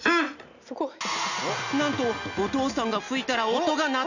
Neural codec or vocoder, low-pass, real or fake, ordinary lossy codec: none; 7.2 kHz; real; none